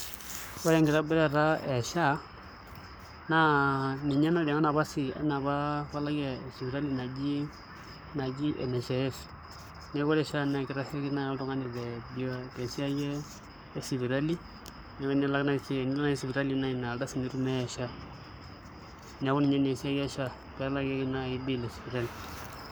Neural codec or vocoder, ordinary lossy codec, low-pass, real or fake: codec, 44.1 kHz, 7.8 kbps, Pupu-Codec; none; none; fake